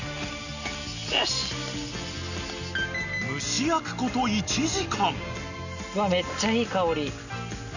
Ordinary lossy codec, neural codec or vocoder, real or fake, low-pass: none; none; real; 7.2 kHz